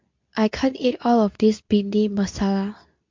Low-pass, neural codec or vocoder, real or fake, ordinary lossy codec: 7.2 kHz; codec, 24 kHz, 0.9 kbps, WavTokenizer, medium speech release version 2; fake; MP3, 64 kbps